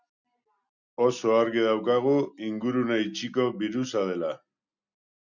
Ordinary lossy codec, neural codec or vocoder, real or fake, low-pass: Opus, 64 kbps; none; real; 7.2 kHz